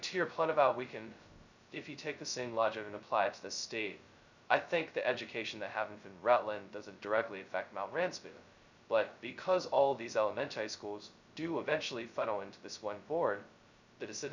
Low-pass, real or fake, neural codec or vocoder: 7.2 kHz; fake; codec, 16 kHz, 0.2 kbps, FocalCodec